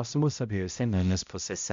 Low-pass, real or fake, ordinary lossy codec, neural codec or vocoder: 7.2 kHz; fake; MP3, 64 kbps; codec, 16 kHz, 0.5 kbps, X-Codec, HuBERT features, trained on balanced general audio